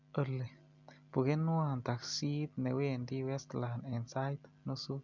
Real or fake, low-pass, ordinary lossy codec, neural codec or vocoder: real; 7.2 kHz; none; none